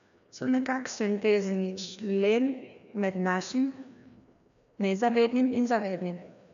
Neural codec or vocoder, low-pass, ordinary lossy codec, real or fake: codec, 16 kHz, 1 kbps, FreqCodec, larger model; 7.2 kHz; none; fake